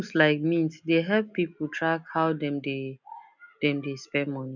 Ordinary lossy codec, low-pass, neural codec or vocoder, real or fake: none; 7.2 kHz; none; real